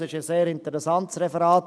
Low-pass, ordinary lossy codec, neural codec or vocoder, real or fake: none; none; none; real